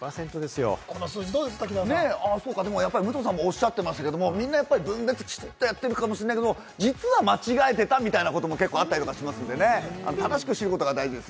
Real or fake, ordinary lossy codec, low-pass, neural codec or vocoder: real; none; none; none